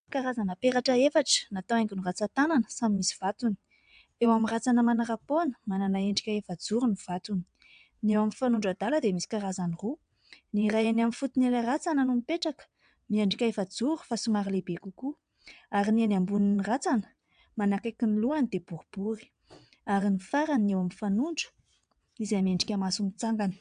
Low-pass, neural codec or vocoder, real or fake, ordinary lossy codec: 9.9 kHz; vocoder, 22.05 kHz, 80 mel bands, WaveNeXt; fake; AAC, 96 kbps